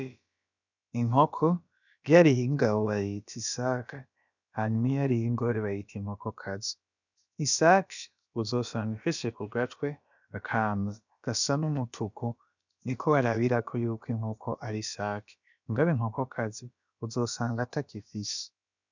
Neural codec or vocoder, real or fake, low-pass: codec, 16 kHz, about 1 kbps, DyCAST, with the encoder's durations; fake; 7.2 kHz